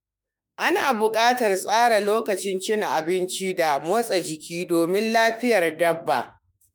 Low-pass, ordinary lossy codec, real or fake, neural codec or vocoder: none; none; fake; autoencoder, 48 kHz, 32 numbers a frame, DAC-VAE, trained on Japanese speech